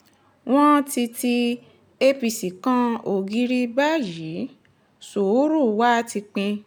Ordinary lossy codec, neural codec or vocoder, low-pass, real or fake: none; none; none; real